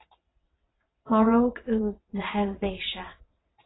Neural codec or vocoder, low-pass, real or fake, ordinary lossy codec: vocoder, 22.05 kHz, 80 mel bands, WaveNeXt; 7.2 kHz; fake; AAC, 16 kbps